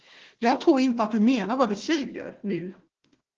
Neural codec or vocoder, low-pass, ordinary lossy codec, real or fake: codec, 16 kHz, 1 kbps, FunCodec, trained on Chinese and English, 50 frames a second; 7.2 kHz; Opus, 16 kbps; fake